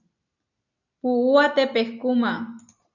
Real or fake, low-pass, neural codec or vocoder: real; 7.2 kHz; none